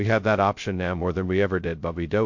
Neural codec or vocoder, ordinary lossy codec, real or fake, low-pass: codec, 16 kHz, 0.2 kbps, FocalCodec; MP3, 48 kbps; fake; 7.2 kHz